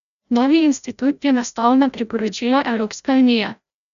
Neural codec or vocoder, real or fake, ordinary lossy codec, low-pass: codec, 16 kHz, 0.5 kbps, FreqCodec, larger model; fake; none; 7.2 kHz